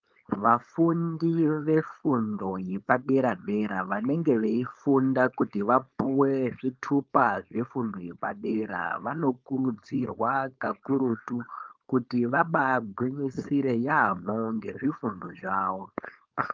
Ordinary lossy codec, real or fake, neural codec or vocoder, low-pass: Opus, 32 kbps; fake; codec, 16 kHz, 4.8 kbps, FACodec; 7.2 kHz